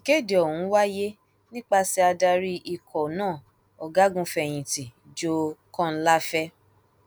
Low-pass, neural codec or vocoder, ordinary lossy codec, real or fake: none; none; none; real